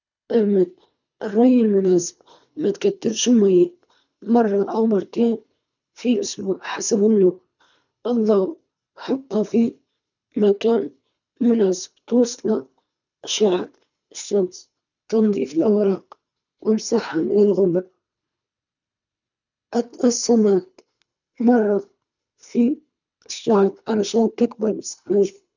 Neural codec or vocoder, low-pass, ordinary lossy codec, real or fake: codec, 24 kHz, 3 kbps, HILCodec; 7.2 kHz; none; fake